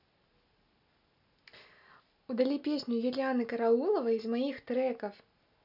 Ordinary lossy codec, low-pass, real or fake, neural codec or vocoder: none; 5.4 kHz; real; none